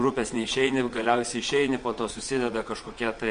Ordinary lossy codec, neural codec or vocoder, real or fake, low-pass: MP3, 48 kbps; vocoder, 22.05 kHz, 80 mel bands, Vocos; fake; 9.9 kHz